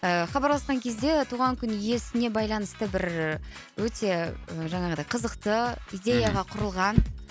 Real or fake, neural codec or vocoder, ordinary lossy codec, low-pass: real; none; none; none